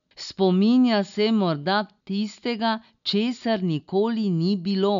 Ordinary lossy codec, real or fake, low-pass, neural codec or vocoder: none; real; 7.2 kHz; none